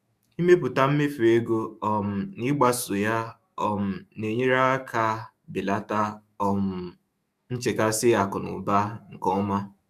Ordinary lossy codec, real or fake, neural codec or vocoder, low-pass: Opus, 64 kbps; fake; autoencoder, 48 kHz, 128 numbers a frame, DAC-VAE, trained on Japanese speech; 14.4 kHz